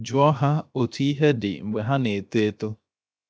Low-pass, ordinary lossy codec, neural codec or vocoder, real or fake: none; none; codec, 16 kHz, 0.7 kbps, FocalCodec; fake